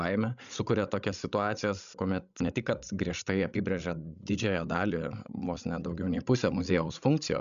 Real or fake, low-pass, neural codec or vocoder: fake; 7.2 kHz; codec, 16 kHz, 16 kbps, FreqCodec, larger model